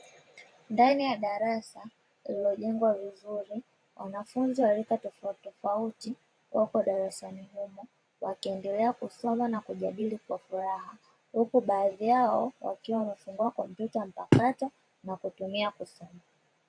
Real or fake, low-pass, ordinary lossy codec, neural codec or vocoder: fake; 9.9 kHz; AAC, 48 kbps; vocoder, 44.1 kHz, 128 mel bands every 512 samples, BigVGAN v2